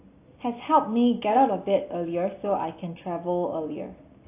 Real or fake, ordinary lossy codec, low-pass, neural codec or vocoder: real; AAC, 24 kbps; 3.6 kHz; none